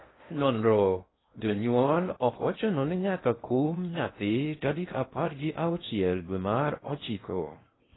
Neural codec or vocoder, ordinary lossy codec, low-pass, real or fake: codec, 16 kHz in and 24 kHz out, 0.6 kbps, FocalCodec, streaming, 2048 codes; AAC, 16 kbps; 7.2 kHz; fake